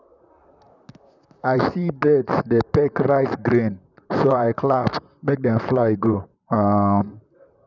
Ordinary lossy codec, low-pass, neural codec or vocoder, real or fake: none; 7.2 kHz; vocoder, 44.1 kHz, 128 mel bands, Pupu-Vocoder; fake